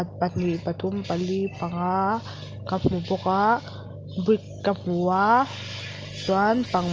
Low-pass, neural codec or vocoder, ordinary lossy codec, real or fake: 7.2 kHz; none; Opus, 24 kbps; real